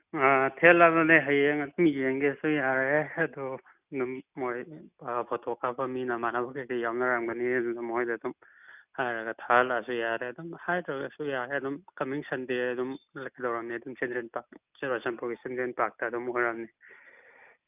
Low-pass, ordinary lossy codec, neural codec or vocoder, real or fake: 3.6 kHz; none; none; real